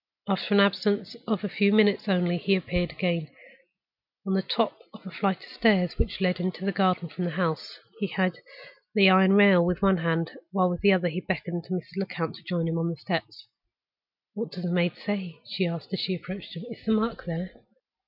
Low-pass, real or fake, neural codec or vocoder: 5.4 kHz; real; none